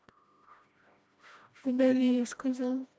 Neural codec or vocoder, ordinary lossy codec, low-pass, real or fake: codec, 16 kHz, 1 kbps, FreqCodec, smaller model; none; none; fake